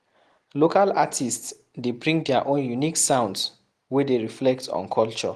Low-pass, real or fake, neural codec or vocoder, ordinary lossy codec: 14.4 kHz; real; none; Opus, 24 kbps